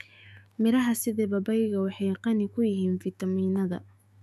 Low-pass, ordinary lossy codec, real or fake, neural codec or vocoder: 14.4 kHz; none; fake; autoencoder, 48 kHz, 128 numbers a frame, DAC-VAE, trained on Japanese speech